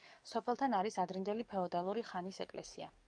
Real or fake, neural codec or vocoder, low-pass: fake; codec, 44.1 kHz, 7.8 kbps, DAC; 9.9 kHz